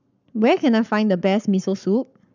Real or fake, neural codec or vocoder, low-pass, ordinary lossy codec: fake; codec, 44.1 kHz, 7.8 kbps, Pupu-Codec; 7.2 kHz; none